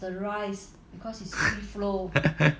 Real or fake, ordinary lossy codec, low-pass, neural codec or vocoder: real; none; none; none